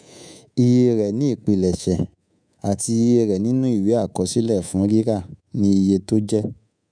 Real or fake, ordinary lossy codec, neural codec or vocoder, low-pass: fake; none; codec, 24 kHz, 3.1 kbps, DualCodec; 9.9 kHz